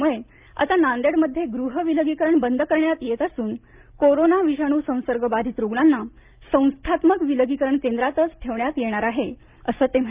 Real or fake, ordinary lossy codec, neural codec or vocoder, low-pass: real; Opus, 24 kbps; none; 3.6 kHz